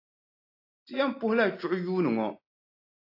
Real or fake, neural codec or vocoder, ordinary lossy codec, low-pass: real; none; AAC, 32 kbps; 5.4 kHz